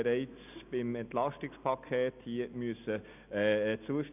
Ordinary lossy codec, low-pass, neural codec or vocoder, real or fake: none; 3.6 kHz; none; real